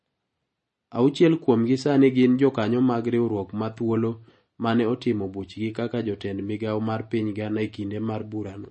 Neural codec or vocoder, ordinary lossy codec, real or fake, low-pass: none; MP3, 32 kbps; real; 10.8 kHz